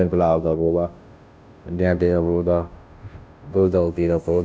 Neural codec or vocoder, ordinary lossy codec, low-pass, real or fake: codec, 16 kHz, 0.5 kbps, FunCodec, trained on Chinese and English, 25 frames a second; none; none; fake